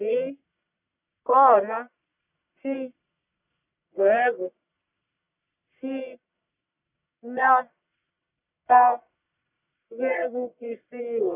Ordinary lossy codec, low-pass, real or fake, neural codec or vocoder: none; 3.6 kHz; fake; codec, 44.1 kHz, 1.7 kbps, Pupu-Codec